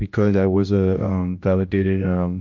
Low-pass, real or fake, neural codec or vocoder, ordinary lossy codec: 7.2 kHz; fake; codec, 16 kHz, 1 kbps, X-Codec, HuBERT features, trained on general audio; MP3, 48 kbps